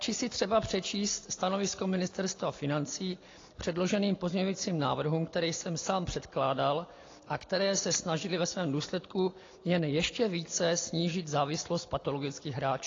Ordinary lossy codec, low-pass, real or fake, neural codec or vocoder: AAC, 32 kbps; 7.2 kHz; real; none